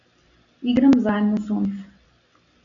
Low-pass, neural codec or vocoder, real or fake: 7.2 kHz; none; real